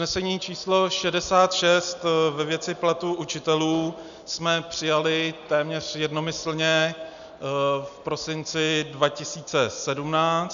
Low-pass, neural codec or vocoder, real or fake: 7.2 kHz; none; real